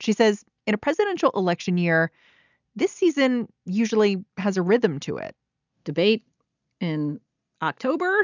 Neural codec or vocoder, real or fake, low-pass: none; real; 7.2 kHz